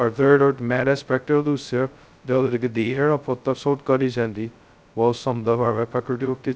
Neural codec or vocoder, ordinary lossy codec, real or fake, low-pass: codec, 16 kHz, 0.2 kbps, FocalCodec; none; fake; none